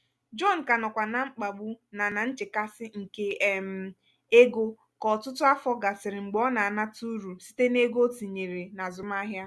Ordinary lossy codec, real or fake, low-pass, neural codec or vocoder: none; real; none; none